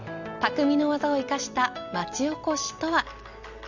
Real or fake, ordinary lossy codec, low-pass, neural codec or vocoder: real; none; 7.2 kHz; none